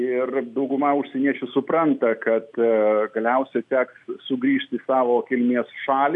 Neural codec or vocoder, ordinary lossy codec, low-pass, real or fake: none; AAC, 64 kbps; 10.8 kHz; real